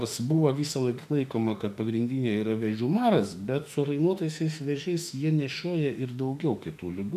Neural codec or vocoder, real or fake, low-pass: autoencoder, 48 kHz, 32 numbers a frame, DAC-VAE, trained on Japanese speech; fake; 14.4 kHz